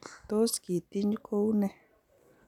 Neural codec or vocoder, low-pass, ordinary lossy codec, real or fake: none; 19.8 kHz; none; real